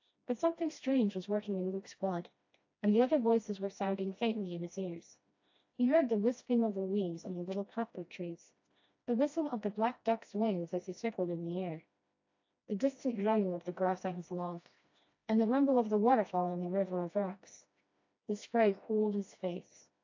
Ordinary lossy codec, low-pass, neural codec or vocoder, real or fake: AAC, 48 kbps; 7.2 kHz; codec, 16 kHz, 1 kbps, FreqCodec, smaller model; fake